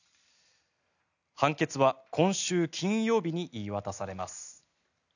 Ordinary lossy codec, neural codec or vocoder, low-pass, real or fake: none; none; 7.2 kHz; real